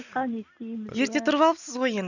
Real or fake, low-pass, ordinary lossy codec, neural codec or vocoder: real; 7.2 kHz; none; none